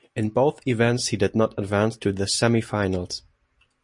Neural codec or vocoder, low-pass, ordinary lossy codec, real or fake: none; 10.8 kHz; MP3, 48 kbps; real